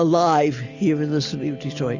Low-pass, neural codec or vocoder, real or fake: 7.2 kHz; none; real